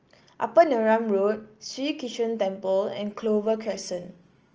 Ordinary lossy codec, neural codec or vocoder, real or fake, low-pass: Opus, 24 kbps; none; real; 7.2 kHz